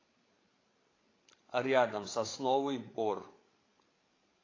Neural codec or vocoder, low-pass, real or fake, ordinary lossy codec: codec, 16 kHz, 8 kbps, FreqCodec, larger model; 7.2 kHz; fake; AAC, 32 kbps